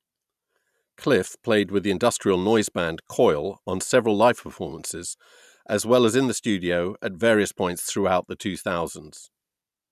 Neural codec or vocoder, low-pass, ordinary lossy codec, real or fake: none; 14.4 kHz; none; real